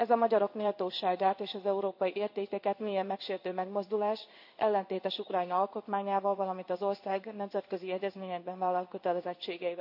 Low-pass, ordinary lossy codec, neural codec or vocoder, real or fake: 5.4 kHz; none; codec, 16 kHz in and 24 kHz out, 1 kbps, XY-Tokenizer; fake